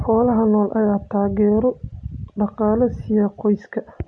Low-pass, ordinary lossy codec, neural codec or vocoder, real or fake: 9.9 kHz; none; none; real